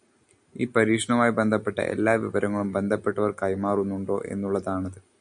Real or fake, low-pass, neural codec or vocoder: real; 9.9 kHz; none